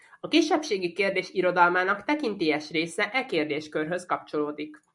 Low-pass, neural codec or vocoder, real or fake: 10.8 kHz; none; real